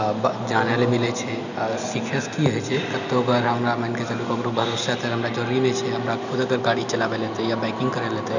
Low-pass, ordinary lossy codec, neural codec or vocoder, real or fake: 7.2 kHz; none; none; real